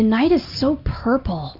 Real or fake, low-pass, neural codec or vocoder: real; 5.4 kHz; none